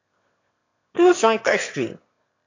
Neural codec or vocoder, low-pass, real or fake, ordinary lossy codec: autoencoder, 22.05 kHz, a latent of 192 numbers a frame, VITS, trained on one speaker; 7.2 kHz; fake; AAC, 48 kbps